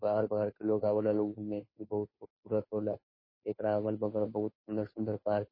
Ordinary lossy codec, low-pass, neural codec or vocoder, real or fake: MP3, 24 kbps; 5.4 kHz; codec, 16 kHz, 2 kbps, FunCodec, trained on Chinese and English, 25 frames a second; fake